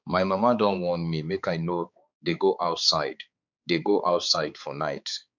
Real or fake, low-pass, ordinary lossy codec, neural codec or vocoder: fake; 7.2 kHz; none; codec, 16 kHz, 4 kbps, X-Codec, HuBERT features, trained on balanced general audio